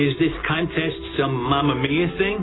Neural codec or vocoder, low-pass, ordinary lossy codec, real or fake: none; 7.2 kHz; AAC, 16 kbps; real